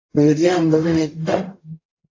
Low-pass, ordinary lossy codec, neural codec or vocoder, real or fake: 7.2 kHz; AAC, 32 kbps; codec, 44.1 kHz, 0.9 kbps, DAC; fake